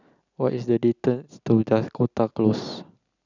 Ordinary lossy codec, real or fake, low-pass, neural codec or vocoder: none; real; 7.2 kHz; none